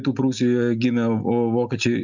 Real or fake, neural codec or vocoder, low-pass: real; none; 7.2 kHz